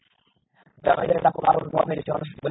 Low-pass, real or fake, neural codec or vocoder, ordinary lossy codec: 7.2 kHz; real; none; AAC, 16 kbps